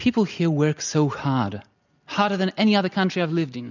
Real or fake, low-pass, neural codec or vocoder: real; 7.2 kHz; none